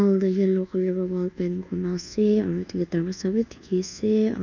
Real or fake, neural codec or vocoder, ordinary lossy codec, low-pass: fake; codec, 24 kHz, 1.2 kbps, DualCodec; none; 7.2 kHz